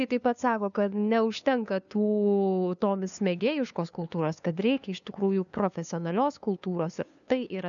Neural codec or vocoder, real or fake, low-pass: codec, 16 kHz, 2 kbps, FunCodec, trained on LibriTTS, 25 frames a second; fake; 7.2 kHz